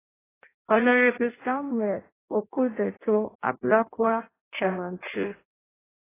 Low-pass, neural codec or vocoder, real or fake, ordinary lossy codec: 3.6 kHz; codec, 16 kHz in and 24 kHz out, 0.6 kbps, FireRedTTS-2 codec; fake; AAC, 16 kbps